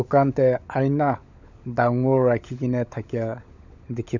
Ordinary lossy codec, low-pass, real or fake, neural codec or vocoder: none; 7.2 kHz; fake; codec, 16 kHz, 16 kbps, FunCodec, trained on LibriTTS, 50 frames a second